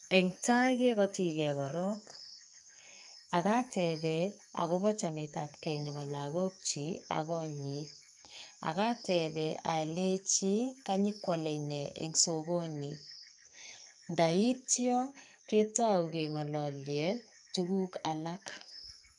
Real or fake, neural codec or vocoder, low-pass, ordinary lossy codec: fake; codec, 44.1 kHz, 2.6 kbps, SNAC; 10.8 kHz; none